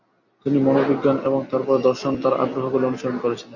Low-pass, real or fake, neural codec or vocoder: 7.2 kHz; real; none